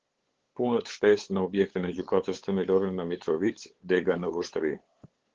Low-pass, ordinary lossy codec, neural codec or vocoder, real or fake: 7.2 kHz; Opus, 16 kbps; codec, 16 kHz, 8 kbps, FunCodec, trained on LibriTTS, 25 frames a second; fake